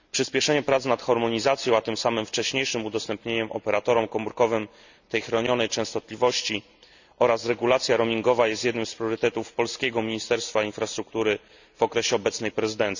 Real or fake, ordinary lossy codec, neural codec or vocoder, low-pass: real; none; none; 7.2 kHz